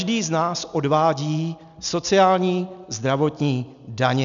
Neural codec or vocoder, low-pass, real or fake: none; 7.2 kHz; real